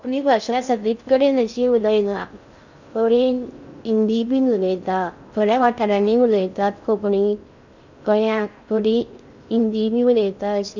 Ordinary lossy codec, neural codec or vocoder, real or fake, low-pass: none; codec, 16 kHz in and 24 kHz out, 0.6 kbps, FocalCodec, streaming, 4096 codes; fake; 7.2 kHz